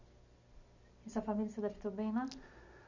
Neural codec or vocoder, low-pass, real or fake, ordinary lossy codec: none; 7.2 kHz; real; none